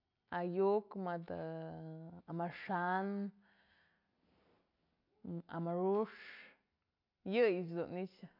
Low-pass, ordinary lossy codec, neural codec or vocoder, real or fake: 5.4 kHz; MP3, 48 kbps; none; real